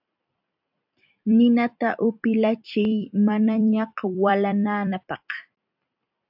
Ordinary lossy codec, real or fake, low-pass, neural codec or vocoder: AAC, 48 kbps; fake; 5.4 kHz; vocoder, 44.1 kHz, 128 mel bands every 256 samples, BigVGAN v2